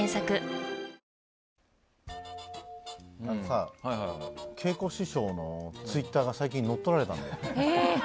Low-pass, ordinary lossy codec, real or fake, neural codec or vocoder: none; none; real; none